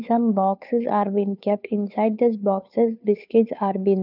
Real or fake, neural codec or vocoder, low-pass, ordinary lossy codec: fake; codec, 16 kHz, 2 kbps, FunCodec, trained on Chinese and English, 25 frames a second; 5.4 kHz; none